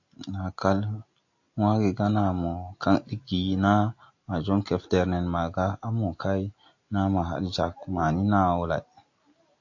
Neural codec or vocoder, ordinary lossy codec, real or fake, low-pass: none; AAC, 48 kbps; real; 7.2 kHz